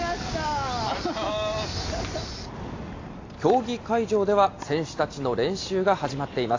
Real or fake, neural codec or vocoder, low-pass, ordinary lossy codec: real; none; 7.2 kHz; AAC, 32 kbps